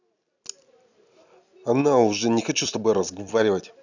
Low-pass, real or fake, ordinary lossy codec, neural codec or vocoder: 7.2 kHz; real; none; none